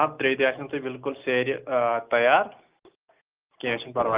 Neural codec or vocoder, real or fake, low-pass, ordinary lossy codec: none; real; 3.6 kHz; Opus, 32 kbps